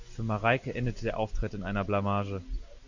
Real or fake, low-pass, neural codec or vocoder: real; 7.2 kHz; none